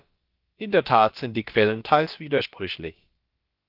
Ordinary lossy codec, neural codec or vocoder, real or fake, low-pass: Opus, 32 kbps; codec, 16 kHz, about 1 kbps, DyCAST, with the encoder's durations; fake; 5.4 kHz